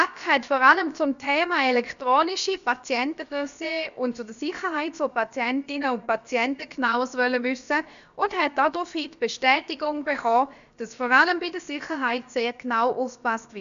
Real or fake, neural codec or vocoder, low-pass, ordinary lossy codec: fake; codec, 16 kHz, about 1 kbps, DyCAST, with the encoder's durations; 7.2 kHz; MP3, 96 kbps